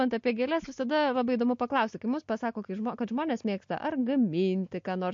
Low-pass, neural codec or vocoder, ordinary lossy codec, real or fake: 7.2 kHz; none; MP3, 48 kbps; real